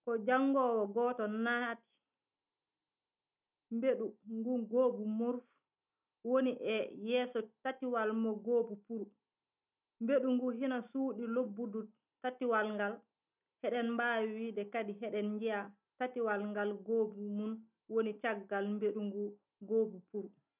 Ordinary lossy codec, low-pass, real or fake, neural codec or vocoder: none; 3.6 kHz; real; none